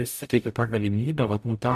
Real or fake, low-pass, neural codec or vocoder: fake; 14.4 kHz; codec, 44.1 kHz, 0.9 kbps, DAC